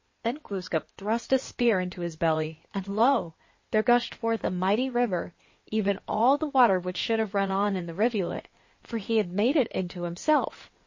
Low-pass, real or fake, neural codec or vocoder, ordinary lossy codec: 7.2 kHz; fake; codec, 16 kHz in and 24 kHz out, 2.2 kbps, FireRedTTS-2 codec; MP3, 32 kbps